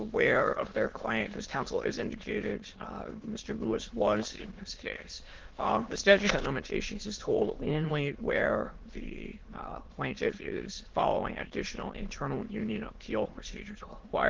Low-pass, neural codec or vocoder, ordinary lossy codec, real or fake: 7.2 kHz; autoencoder, 22.05 kHz, a latent of 192 numbers a frame, VITS, trained on many speakers; Opus, 16 kbps; fake